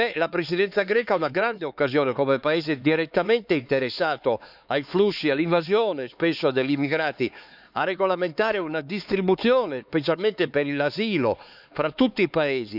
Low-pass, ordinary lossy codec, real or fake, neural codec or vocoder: 5.4 kHz; none; fake; codec, 16 kHz, 4 kbps, X-Codec, HuBERT features, trained on LibriSpeech